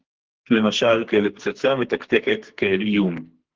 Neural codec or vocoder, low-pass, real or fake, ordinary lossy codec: codec, 44.1 kHz, 2.6 kbps, SNAC; 7.2 kHz; fake; Opus, 16 kbps